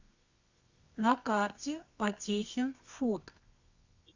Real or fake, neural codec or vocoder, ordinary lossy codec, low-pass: fake; codec, 24 kHz, 0.9 kbps, WavTokenizer, medium music audio release; Opus, 64 kbps; 7.2 kHz